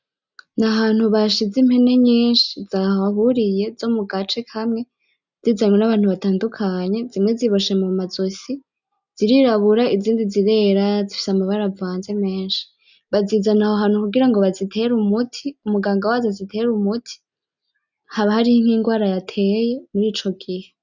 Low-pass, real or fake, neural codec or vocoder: 7.2 kHz; real; none